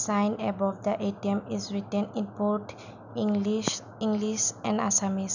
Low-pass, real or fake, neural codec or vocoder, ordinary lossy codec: 7.2 kHz; real; none; none